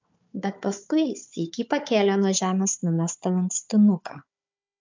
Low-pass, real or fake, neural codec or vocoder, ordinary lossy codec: 7.2 kHz; fake; codec, 16 kHz, 4 kbps, FunCodec, trained on Chinese and English, 50 frames a second; MP3, 64 kbps